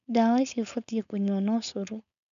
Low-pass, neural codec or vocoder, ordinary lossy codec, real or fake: 7.2 kHz; codec, 16 kHz, 4.8 kbps, FACodec; none; fake